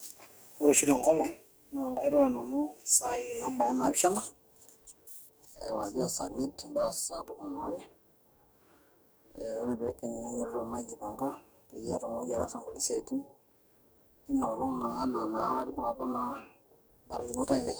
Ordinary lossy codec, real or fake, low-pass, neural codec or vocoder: none; fake; none; codec, 44.1 kHz, 2.6 kbps, DAC